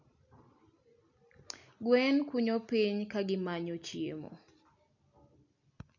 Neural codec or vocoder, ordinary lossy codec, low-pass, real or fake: none; none; 7.2 kHz; real